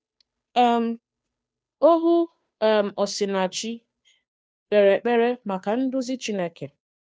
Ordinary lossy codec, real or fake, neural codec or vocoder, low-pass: none; fake; codec, 16 kHz, 2 kbps, FunCodec, trained on Chinese and English, 25 frames a second; none